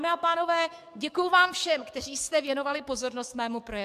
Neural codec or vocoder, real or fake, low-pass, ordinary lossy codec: codec, 44.1 kHz, 7.8 kbps, DAC; fake; 14.4 kHz; MP3, 96 kbps